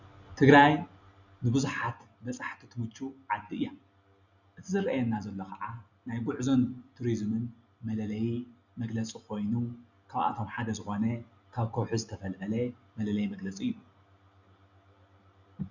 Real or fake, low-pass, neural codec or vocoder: real; 7.2 kHz; none